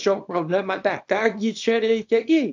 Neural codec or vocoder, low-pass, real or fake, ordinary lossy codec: codec, 24 kHz, 0.9 kbps, WavTokenizer, small release; 7.2 kHz; fake; AAC, 48 kbps